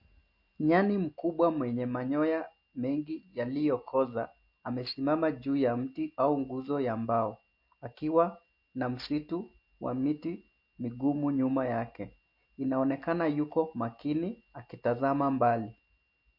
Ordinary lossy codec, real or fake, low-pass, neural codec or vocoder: MP3, 32 kbps; real; 5.4 kHz; none